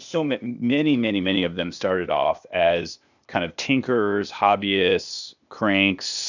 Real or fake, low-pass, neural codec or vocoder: fake; 7.2 kHz; codec, 16 kHz, 0.8 kbps, ZipCodec